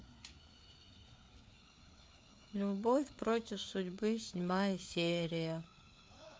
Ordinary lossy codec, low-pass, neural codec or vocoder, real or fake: none; none; codec, 16 kHz, 4 kbps, FreqCodec, larger model; fake